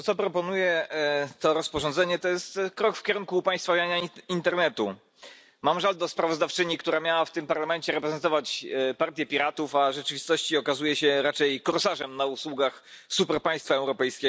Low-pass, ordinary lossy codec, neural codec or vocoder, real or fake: none; none; none; real